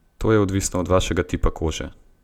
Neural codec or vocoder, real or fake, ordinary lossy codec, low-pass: none; real; none; 19.8 kHz